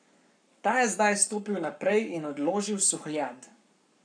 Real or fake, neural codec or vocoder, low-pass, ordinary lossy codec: fake; codec, 44.1 kHz, 7.8 kbps, Pupu-Codec; 9.9 kHz; none